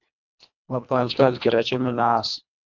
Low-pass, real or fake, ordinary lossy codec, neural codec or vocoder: 7.2 kHz; fake; MP3, 64 kbps; codec, 24 kHz, 1.5 kbps, HILCodec